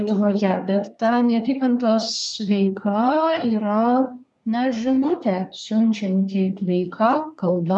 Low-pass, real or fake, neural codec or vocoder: 10.8 kHz; fake; codec, 24 kHz, 1 kbps, SNAC